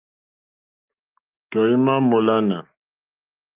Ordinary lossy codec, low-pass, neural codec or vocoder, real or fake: Opus, 24 kbps; 3.6 kHz; none; real